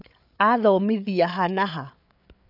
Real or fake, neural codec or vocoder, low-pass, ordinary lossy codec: fake; codec, 16 kHz, 8 kbps, FreqCodec, larger model; 5.4 kHz; none